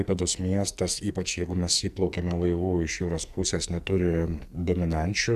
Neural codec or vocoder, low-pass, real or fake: codec, 44.1 kHz, 2.6 kbps, SNAC; 14.4 kHz; fake